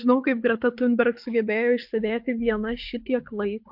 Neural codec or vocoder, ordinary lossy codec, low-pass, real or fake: codec, 24 kHz, 6 kbps, HILCodec; MP3, 48 kbps; 5.4 kHz; fake